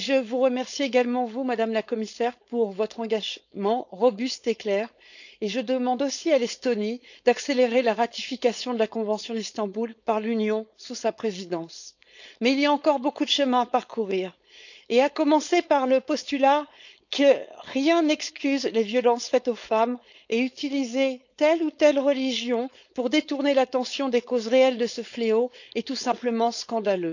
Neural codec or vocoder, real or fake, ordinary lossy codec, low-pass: codec, 16 kHz, 4.8 kbps, FACodec; fake; none; 7.2 kHz